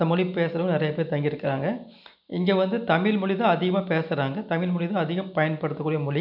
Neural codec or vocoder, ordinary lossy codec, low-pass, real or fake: none; none; 5.4 kHz; real